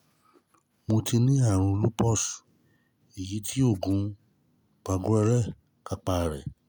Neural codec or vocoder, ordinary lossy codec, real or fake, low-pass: none; none; real; none